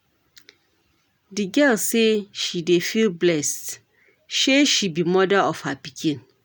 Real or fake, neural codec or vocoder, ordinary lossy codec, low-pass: real; none; none; none